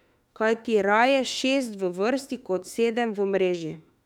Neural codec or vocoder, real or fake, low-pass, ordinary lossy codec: autoencoder, 48 kHz, 32 numbers a frame, DAC-VAE, trained on Japanese speech; fake; 19.8 kHz; none